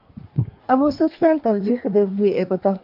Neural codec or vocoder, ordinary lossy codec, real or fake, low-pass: codec, 24 kHz, 1 kbps, SNAC; MP3, 48 kbps; fake; 5.4 kHz